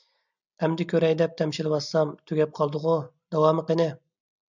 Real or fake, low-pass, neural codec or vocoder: real; 7.2 kHz; none